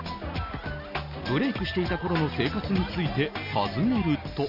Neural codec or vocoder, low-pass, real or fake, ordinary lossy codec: none; 5.4 kHz; real; none